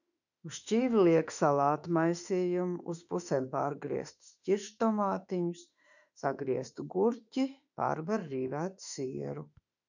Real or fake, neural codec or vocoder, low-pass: fake; autoencoder, 48 kHz, 32 numbers a frame, DAC-VAE, trained on Japanese speech; 7.2 kHz